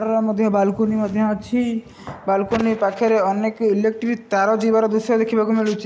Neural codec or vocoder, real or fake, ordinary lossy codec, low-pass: none; real; none; none